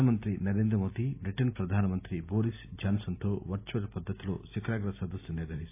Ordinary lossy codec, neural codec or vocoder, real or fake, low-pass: AAC, 24 kbps; none; real; 3.6 kHz